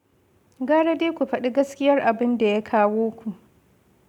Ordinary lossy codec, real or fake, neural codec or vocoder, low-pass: none; real; none; 19.8 kHz